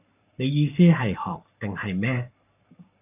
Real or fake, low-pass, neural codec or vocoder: fake; 3.6 kHz; codec, 44.1 kHz, 7.8 kbps, Pupu-Codec